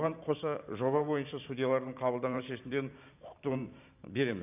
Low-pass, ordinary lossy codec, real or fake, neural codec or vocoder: 3.6 kHz; none; fake; vocoder, 44.1 kHz, 128 mel bands every 512 samples, BigVGAN v2